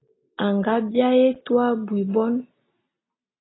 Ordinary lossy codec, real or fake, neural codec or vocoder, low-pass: AAC, 16 kbps; real; none; 7.2 kHz